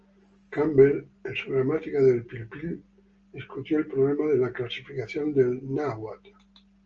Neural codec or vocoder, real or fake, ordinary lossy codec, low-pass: none; real; Opus, 24 kbps; 7.2 kHz